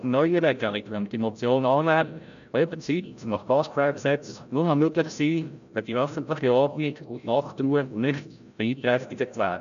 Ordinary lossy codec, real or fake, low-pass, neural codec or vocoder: none; fake; 7.2 kHz; codec, 16 kHz, 0.5 kbps, FreqCodec, larger model